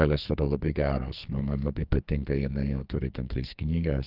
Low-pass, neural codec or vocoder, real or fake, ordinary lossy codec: 5.4 kHz; codec, 44.1 kHz, 2.6 kbps, SNAC; fake; Opus, 32 kbps